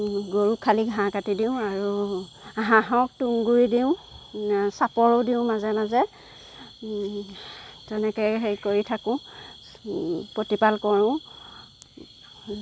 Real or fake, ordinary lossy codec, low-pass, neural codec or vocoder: real; none; none; none